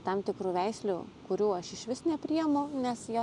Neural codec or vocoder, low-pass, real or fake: none; 10.8 kHz; real